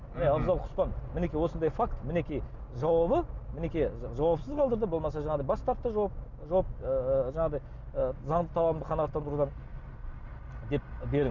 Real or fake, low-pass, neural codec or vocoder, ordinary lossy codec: real; 7.2 kHz; none; none